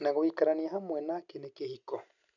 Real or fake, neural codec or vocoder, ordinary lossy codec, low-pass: real; none; none; 7.2 kHz